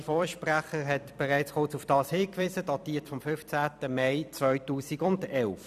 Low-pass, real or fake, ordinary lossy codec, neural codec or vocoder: 14.4 kHz; fake; none; vocoder, 44.1 kHz, 128 mel bands every 256 samples, BigVGAN v2